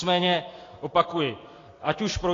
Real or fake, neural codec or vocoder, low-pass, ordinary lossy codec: real; none; 7.2 kHz; AAC, 32 kbps